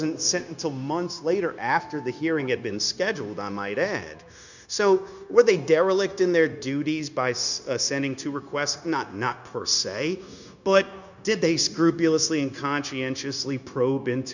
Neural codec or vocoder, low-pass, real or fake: codec, 16 kHz, 0.9 kbps, LongCat-Audio-Codec; 7.2 kHz; fake